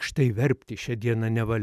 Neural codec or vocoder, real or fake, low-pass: none; real; 14.4 kHz